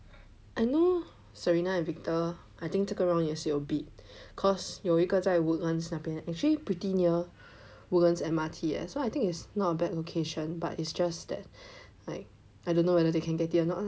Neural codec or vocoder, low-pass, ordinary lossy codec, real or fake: none; none; none; real